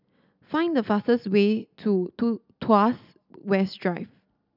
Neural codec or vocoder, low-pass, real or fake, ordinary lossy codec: none; 5.4 kHz; real; none